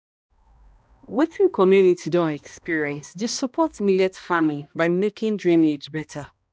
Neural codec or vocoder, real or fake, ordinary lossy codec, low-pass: codec, 16 kHz, 1 kbps, X-Codec, HuBERT features, trained on balanced general audio; fake; none; none